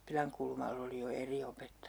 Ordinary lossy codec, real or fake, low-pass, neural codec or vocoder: none; real; none; none